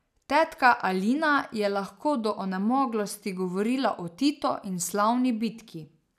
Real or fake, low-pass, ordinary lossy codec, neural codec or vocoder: real; 14.4 kHz; none; none